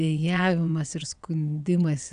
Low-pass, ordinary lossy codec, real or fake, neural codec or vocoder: 9.9 kHz; AAC, 96 kbps; fake; vocoder, 22.05 kHz, 80 mel bands, WaveNeXt